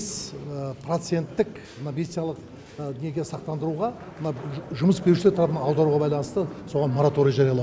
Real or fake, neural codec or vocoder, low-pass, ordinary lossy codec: real; none; none; none